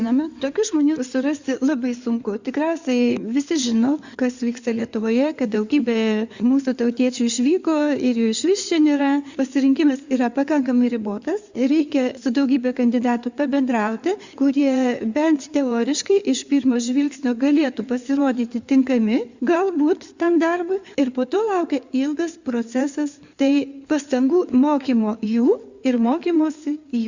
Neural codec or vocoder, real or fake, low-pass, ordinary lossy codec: codec, 16 kHz in and 24 kHz out, 2.2 kbps, FireRedTTS-2 codec; fake; 7.2 kHz; Opus, 64 kbps